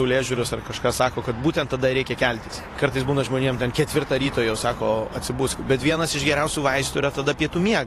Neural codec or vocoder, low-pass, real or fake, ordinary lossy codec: vocoder, 44.1 kHz, 128 mel bands every 512 samples, BigVGAN v2; 14.4 kHz; fake; AAC, 48 kbps